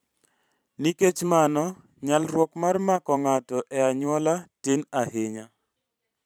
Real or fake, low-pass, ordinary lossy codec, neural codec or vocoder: fake; none; none; vocoder, 44.1 kHz, 128 mel bands every 512 samples, BigVGAN v2